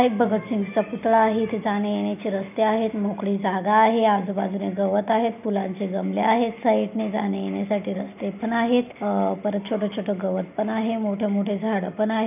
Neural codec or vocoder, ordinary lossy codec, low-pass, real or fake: none; none; 3.6 kHz; real